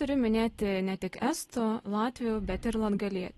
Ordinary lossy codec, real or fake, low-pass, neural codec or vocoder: AAC, 32 kbps; real; 19.8 kHz; none